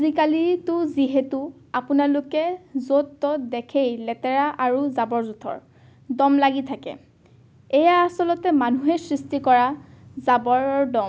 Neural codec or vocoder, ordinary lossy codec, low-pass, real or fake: none; none; none; real